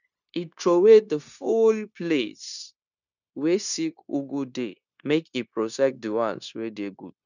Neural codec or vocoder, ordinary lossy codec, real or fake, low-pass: codec, 16 kHz, 0.9 kbps, LongCat-Audio-Codec; none; fake; 7.2 kHz